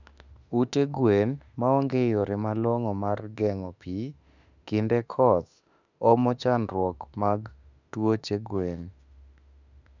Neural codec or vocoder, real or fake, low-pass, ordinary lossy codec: autoencoder, 48 kHz, 32 numbers a frame, DAC-VAE, trained on Japanese speech; fake; 7.2 kHz; none